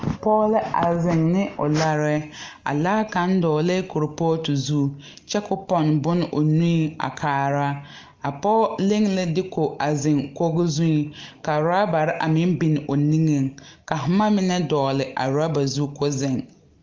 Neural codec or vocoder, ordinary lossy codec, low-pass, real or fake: none; Opus, 32 kbps; 7.2 kHz; real